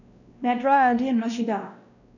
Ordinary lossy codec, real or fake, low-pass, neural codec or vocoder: none; fake; 7.2 kHz; codec, 16 kHz, 1 kbps, X-Codec, WavLM features, trained on Multilingual LibriSpeech